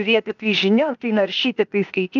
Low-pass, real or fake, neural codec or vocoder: 7.2 kHz; fake; codec, 16 kHz, 0.8 kbps, ZipCodec